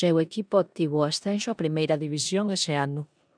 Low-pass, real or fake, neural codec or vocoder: 9.9 kHz; fake; codec, 16 kHz in and 24 kHz out, 0.9 kbps, LongCat-Audio-Codec, four codebook decoder